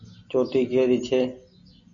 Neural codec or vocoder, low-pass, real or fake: none; 7.2 kHz; real